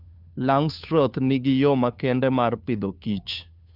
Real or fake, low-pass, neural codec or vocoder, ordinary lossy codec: fake; 5.4 kHz; codec, 16 kHz, 2 kbps, FunCodec, trained on Chinese and English, 25 frames a second; none